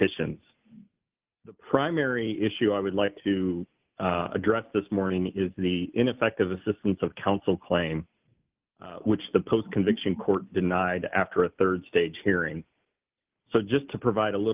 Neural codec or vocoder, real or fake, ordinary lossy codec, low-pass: none; real; Opus, 24 kbps; 3.6 kHz